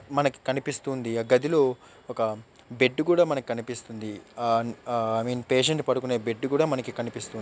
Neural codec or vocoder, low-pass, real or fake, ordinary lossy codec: none; none; real; none